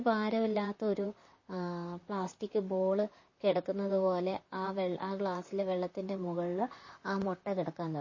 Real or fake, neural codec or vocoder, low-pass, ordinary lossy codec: fake; vocoder, 44.1 kHz, 128 mel bands, Pupu-Vocoder; 7.2 kHz; MP3, 32 kbps